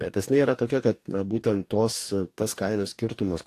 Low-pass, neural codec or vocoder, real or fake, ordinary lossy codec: 14.4 kHz; codec, 44.1 kHz, 2.6 kbps, DAC; fake; AAC, 64 kbps